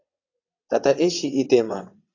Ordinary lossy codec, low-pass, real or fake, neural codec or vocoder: AAC, 48 kbps; 7.2 kHz; fake; vocoder, 22.05 kHz, 80 mel bands, WaveNeXt